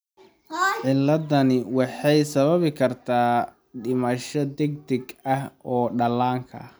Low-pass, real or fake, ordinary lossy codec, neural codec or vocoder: none; real; none; none